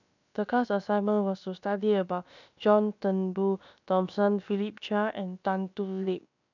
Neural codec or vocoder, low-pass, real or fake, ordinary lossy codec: codec, 16 kHz, about 1 kbps, DyCAST, with the encoder's durations; 7.2 kHz; fake; none